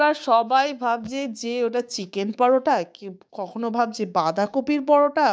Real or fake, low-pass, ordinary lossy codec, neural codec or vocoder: fake; none; none; codec, 16 kHz, 6 kbps, DAC